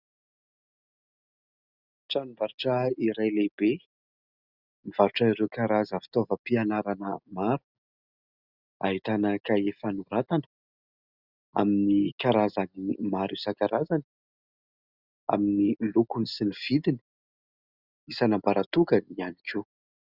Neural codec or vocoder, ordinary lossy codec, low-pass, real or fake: none; Opus, 64 kbps; 5.4 kHz; real